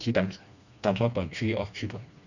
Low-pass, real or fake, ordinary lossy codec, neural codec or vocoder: 7.2 kHz; fake; none; codec, 16 kHz, 2 kbps, FreqCodec, smaller model